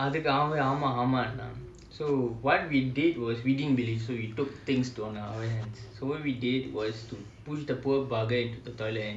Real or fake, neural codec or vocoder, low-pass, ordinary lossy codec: real; none; none; none